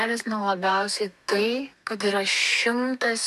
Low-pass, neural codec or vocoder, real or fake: 14.4 kHz; codec, 32 kHz, 1.9 kbps, SNAC; fake